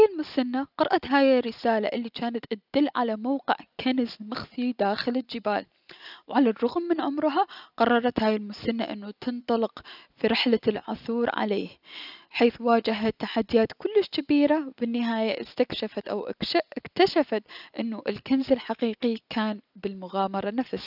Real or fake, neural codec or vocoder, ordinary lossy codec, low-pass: real; none; none; 5.4 kHz